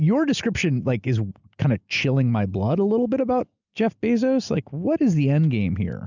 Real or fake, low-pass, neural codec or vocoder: real; 7.2 kHz; none